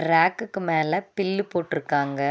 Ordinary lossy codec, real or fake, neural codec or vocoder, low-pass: none; real; none; none